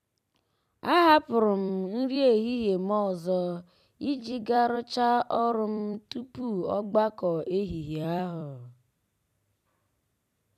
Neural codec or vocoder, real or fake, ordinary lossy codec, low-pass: vocoder, 44.1 kHz, 128 mel bands every 256 samples, BigVGAN v2; fake; none; 14.4 kHz